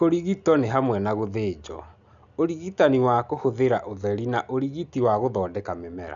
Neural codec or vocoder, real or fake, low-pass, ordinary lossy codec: none; real; 7.2 kHz; none